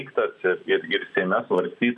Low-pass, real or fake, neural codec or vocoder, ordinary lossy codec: 10.8 kHz; real; none; AAC, 64 kbps